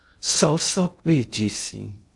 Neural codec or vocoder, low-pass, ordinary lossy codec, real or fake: codec, 16 kHz in and 24 kHz out, 0.6 kbps, FocalCodec, streaming, 2048 codes; 10.8 kHz; AAC, 64 kbps; fake